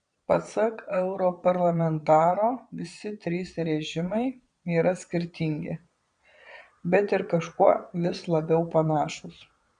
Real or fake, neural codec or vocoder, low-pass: fake; vocoder, 22.05 kHz, 80 mel bands, Vocos; 9.9 kHz